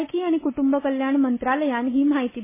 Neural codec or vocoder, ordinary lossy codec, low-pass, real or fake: none; MP3, 16 kbps; 3.6 kHz; real